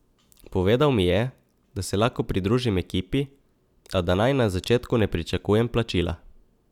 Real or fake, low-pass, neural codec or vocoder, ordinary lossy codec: real; 19.8 kHz; none; none